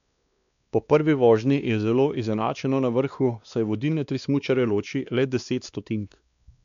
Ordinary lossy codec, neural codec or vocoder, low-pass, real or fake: none; codec, 16 kHz, 2 kbps, X-Codec, WavLM features, trained on Multilingual LibriSpeech; 7.2 kHz; fake